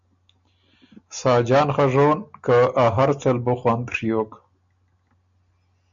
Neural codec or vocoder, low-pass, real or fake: none; 7.2 kHz; real